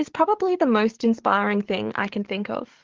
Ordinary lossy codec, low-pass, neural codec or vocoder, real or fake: Opus, 16 kbps; 7.2 kHz; codec, 44.1 kHz, 7.8 kbps, DAC; fake